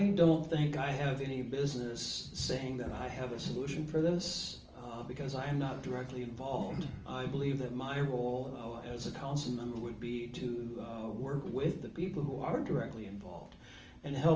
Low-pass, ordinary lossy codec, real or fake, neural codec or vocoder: 7.2 kHz; Opus, 24 kbps; real; none